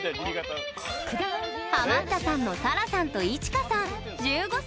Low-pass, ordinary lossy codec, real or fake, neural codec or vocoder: none; none; real; none